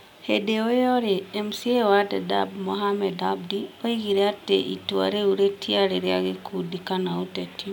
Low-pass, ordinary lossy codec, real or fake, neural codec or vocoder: 19.8 kHz; none; real; none